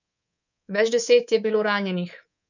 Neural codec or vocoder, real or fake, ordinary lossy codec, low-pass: codec, 24 kHz, 3.1 kbps, DualCodec; fake; none; 7.2 kHz